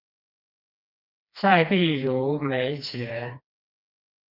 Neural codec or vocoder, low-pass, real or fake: codec, 16 kHz, 2 kbps, FreqCodec, smaller model; 5.4 kHz; fake